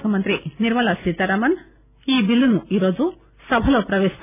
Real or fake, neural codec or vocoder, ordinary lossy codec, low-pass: real; none; none; 3.6 kHz